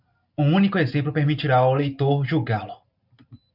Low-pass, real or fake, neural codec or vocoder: 5.4 kHz; real; none